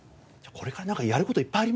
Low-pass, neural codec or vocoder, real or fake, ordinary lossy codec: none; none; real; none